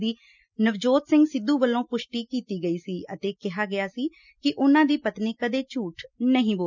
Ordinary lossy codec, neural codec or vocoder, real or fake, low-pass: none; none; real; 7.2 kHz